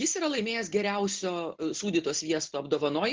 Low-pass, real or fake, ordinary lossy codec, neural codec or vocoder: 7.2 kHz; real; Opus, 16 kbps; none